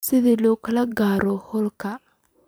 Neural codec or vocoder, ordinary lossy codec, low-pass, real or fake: vocoder, 44.1 kHz, 128 mel bands every 512 samples, BigVGAN v2; none; none; fake